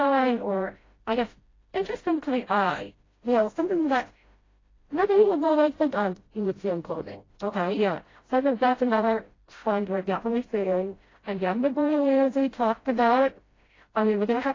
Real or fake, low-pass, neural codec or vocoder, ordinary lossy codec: fake; 7.2 kHz; codec, 16 kHz, 0.5 kbps, FreqCodec, smaller model; AAC, 32 kbps